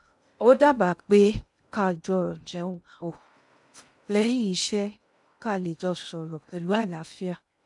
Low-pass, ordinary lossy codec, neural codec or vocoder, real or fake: 10.8 kHz; none; codec, 16 kHz in and 24 kHz out, 0.6 kbps, FocalCodec, streaming, 2048 codes; fake